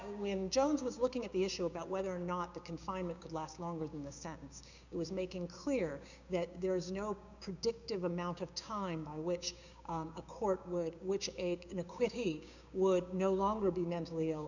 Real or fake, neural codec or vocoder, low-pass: fake; codec, 16 kHz, 6 kbps, DAC; 7.2 kHz